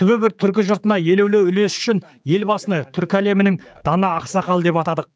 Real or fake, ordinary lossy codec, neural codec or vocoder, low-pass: fake; none; codec, 16 kHz, 4 kbps, X-Codec, HuBERT features, trained on general audio; none